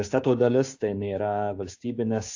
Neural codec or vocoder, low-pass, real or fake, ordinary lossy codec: codec, 16 kHz, 6 kbps, DAC; 7.2 kHz; fake; AAC, 48 kbps